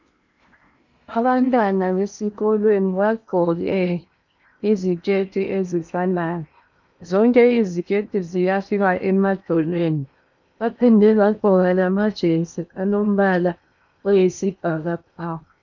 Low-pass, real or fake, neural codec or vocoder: 7.2 kHz; fake; codec, 16 kHz in and 24 kHz out, 0.8 kbps, FocalCodec, streaming, 65536 codes